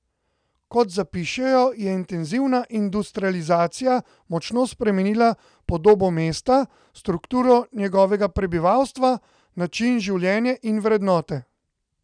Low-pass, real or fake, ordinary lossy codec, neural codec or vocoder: 9.9 kHz; real; none; none